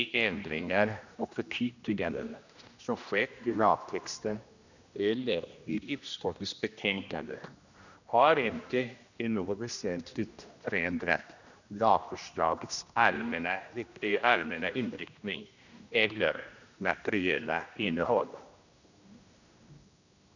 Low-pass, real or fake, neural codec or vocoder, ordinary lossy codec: 7.2 kHz; fake; codec, 16 kHz, 1 kbps, X-Codec, HuBERT features, trained on general audio; none